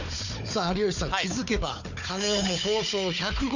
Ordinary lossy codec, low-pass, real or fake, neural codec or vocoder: none; 7.2 kHz; fake; codec, 16 kHz, 4 kbps, FunCodec, trained on Chinese and English, 50 frames a second